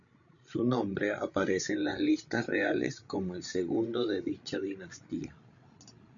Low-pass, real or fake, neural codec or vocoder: 7.2 kHz; fake; codec, 16 kHz, 16 kbps, FreqCodec, larger model